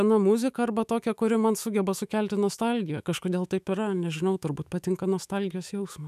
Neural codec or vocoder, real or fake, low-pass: autoencoder, 48 kHz, 128 numbers a frame, DAC-VAE, trained on Japanese speech; fake; 14.4 kHz